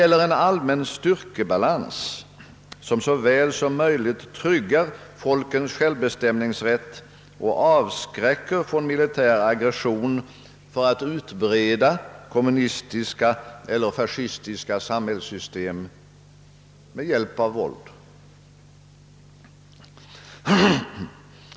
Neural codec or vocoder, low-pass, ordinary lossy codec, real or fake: none; none; none; real